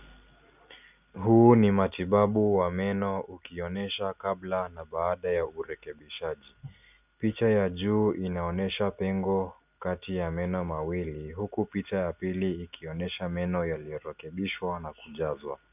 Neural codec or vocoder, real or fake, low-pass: none; real; 3.6 kHz